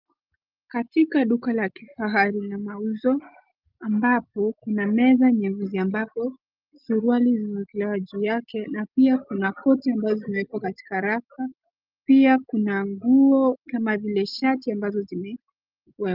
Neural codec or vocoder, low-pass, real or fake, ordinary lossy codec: none; 5.4 kHz; real; Opus, 24 kbps